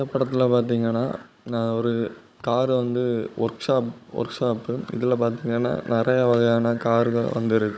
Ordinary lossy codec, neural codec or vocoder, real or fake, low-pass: none; codec, 16 kHz, 16 kbps, FunCodec, trained on Chinese and English, 50 frames a second; fake; none